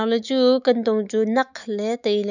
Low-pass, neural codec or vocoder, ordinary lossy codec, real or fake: 7.2 kHz; autoencoder, 48 kHz, 128 numbers a frame, DAC-VAE, trained on Japanese speech; none; fake